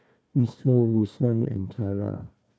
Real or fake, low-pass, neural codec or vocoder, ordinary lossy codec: fake; none; codec, 16 kHz, 1 kbps, FunCodec, trained on Chinese and English, 50 frames a second; none